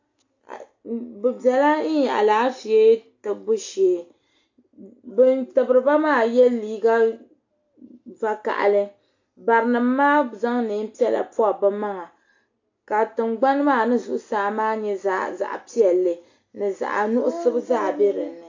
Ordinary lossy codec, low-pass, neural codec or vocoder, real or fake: AAC, 48 kbps; 7.2 kHz; none; real